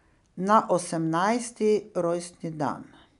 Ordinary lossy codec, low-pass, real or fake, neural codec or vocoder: none; 10.8 kHz; real; none